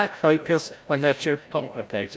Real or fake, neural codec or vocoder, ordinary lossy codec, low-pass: fake; codec, 16 kHz, 0.5 kbps, FreqCodec, larger model; none; none